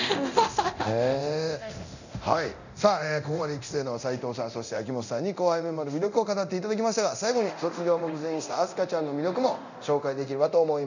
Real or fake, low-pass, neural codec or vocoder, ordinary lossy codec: fake; 7.2 kHz; codec, 24 kHz, 0.9 kbps, DualCodec; none